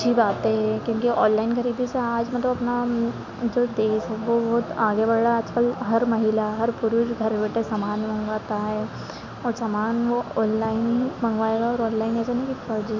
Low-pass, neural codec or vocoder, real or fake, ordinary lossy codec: 7.2 kHz; none; real; none